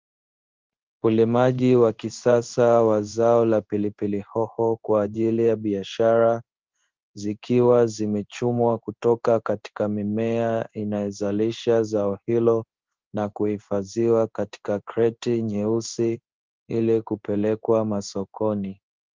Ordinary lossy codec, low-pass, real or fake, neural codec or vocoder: Opus, 32 kbps; 7.2 kHz; fake; codec, 16 kHz in and 24 kHz out, 1 kbps, XY-Tokenizer